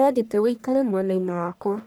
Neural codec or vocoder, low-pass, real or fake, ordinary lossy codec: codec, 44.1 kHz, 1.7 kbps, Pupu-Codec; none; fake; none